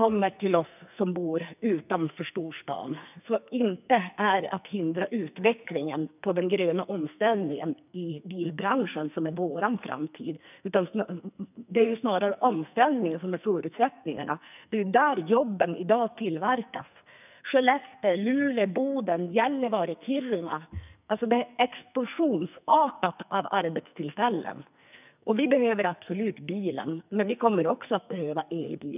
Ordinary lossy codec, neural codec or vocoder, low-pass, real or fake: none; codec, 44.1 kHz, 2.6 kbps, SNAC; 3.6 kHz; fake